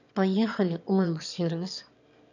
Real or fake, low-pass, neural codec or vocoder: fake; 7.2 kHz; autoencoder, 22.05 kHz, a latent of 192 numbers a frame, VITS, trained on one speaker